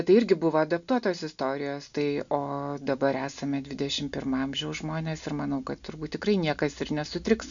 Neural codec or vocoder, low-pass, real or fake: none; 7.2 kHz; real